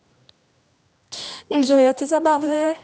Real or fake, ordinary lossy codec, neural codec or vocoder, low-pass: fake; none; codec, 16 kHz, 1 kbps, X-Codec, HuBERT features, trained on general audio; none